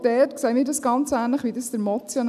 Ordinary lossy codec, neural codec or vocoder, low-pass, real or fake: none; none; 14.4 kHz; real